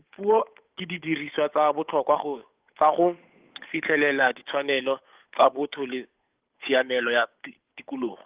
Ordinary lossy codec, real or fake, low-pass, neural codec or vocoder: Opus, 32 kbps; fake; 3.6 kHz; codec, 16 kHz, 8 kbps, FunCodec, trained on Chinese and English, 25 frames a second